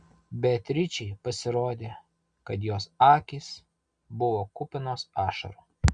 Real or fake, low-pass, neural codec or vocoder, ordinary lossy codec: real; 9.9 kHz; none; Opus, 64 kbps